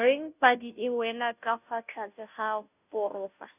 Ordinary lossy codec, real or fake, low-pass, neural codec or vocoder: none; fake; 3.6 kHz; codec, 16 kHz, 0.5 kbps, FunCodec, trained on Chinese and English, 25 frames a second